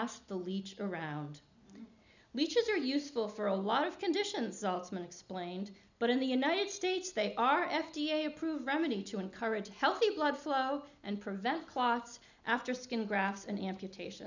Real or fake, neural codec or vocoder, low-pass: real; none; 7.2 kHz